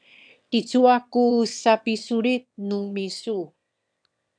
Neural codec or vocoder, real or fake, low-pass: autoencoder, 22.05 kHz, a latent of 192 numbers a frame, VITS, trained on one speaker; fake; 9.9 kHz